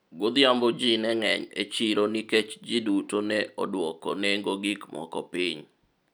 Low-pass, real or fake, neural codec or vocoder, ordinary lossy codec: 19.8 kHz; real; none; none